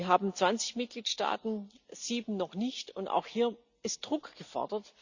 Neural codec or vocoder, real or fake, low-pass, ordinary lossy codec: none; real; 7.2 kHz; Opus, 64 kbps